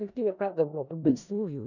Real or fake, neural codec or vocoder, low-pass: fake; codec, 16 kHz in and 24 kHz out, 0.4 kbps, LongCat-Audio-Codec, four codebook decoder; 7.2 kHz